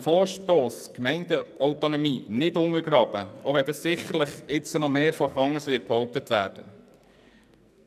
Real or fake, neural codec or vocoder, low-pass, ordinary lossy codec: fake; codec, 44.1 kHz, 2.6 kbps, SNAC; 14.4 kHz; none